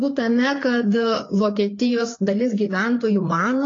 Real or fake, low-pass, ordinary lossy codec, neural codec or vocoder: fake; 7.2 kHz; AAC, 32 kbps; codec, 16 kHz, 4 kbps, FreqCodec, larger model